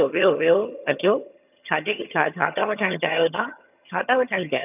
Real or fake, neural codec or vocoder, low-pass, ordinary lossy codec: fake; vocoder, 22.05 kHz, 80 mel bands, HiFi-GAN; 3.6 kHz; none